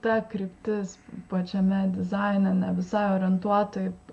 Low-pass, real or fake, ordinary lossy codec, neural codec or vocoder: 10.8 kHz; real; MP3, 64 kbps; none